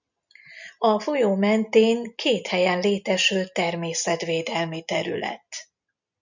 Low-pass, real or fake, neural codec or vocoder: 7.2 kHz; real; none